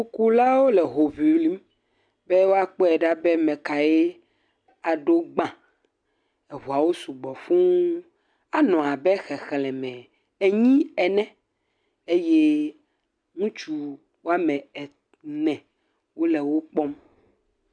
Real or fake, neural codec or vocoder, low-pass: real; none; 9.9 kHz